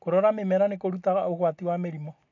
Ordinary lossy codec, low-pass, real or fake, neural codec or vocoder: AAC, 48 kbps; 7.2 kHz; real; none